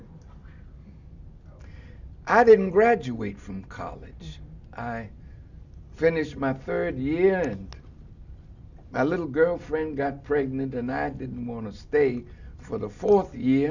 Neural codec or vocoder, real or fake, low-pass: none; real; 7.2 kHz